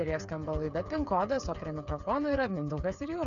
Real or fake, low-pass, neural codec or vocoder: fake; 7.2 kHz; codec, 16 kHz, 8 kbps, FreqCodec, smaller model